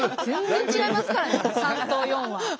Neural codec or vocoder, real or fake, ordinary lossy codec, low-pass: none; real; none; none